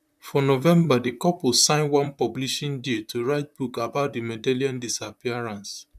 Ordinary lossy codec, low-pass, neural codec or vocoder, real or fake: none; 14.4 kHz; vocoder, 44.1 kHz, 128 mel bands, Pupu-Vocoder; fake